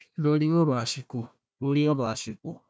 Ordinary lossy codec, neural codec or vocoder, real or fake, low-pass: none; codec, 16 kHz, 1 kbps, FunCodec, trained on Chinese and English, 50 frames a second; fake; none